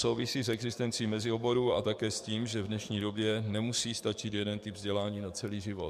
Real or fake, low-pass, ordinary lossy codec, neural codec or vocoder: fake; 14.4 kHz; MP3, 96 kbps; codec, 44.1 kHz, 7.8 kbps, DAC